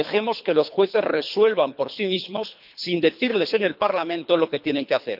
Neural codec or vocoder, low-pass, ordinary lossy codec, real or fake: codec, 24 kHz, 3 kbps, HILCodec; 5.4 kHz; none; fake